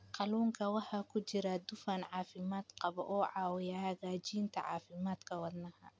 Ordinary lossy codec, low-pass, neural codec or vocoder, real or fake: none; none; none; real